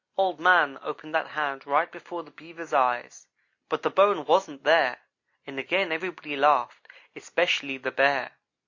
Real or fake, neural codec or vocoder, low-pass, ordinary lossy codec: real; none; 7.2 kHz; Opus, 64 kbps